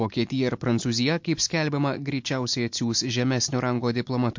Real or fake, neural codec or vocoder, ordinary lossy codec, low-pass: real; none; MP3, 48 kbps; 7.2 kHz